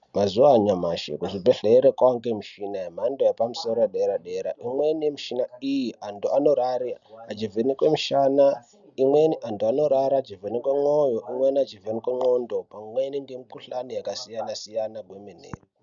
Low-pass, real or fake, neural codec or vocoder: 7.2 kHz; real; none